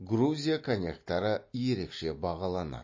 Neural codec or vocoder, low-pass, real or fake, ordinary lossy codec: none; 7.2 kHz; real; MP3, 32 kbps